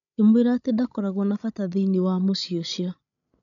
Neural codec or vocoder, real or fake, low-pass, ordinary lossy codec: codec, 16 kHz, 16 kbps, FreqCodec, larger model; fake; 7.2 kHz; none